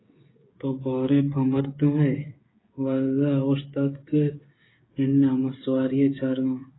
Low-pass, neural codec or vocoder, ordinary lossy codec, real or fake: 7.2 kHz; codec, 16 kHz, 8 kbps, FreqCodec, smaller model; AAC, 16 kbps; fake